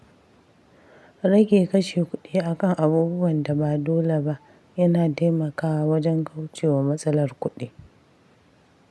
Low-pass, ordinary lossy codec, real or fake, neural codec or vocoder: none; none; real; none